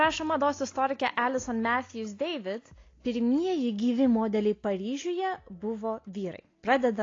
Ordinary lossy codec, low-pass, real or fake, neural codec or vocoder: AAC, 32 kbps; 7.2 kHz; real; none